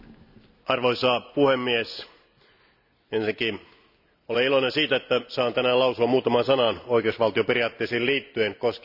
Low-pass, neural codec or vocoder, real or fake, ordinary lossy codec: 5.4 kHz; none; real; none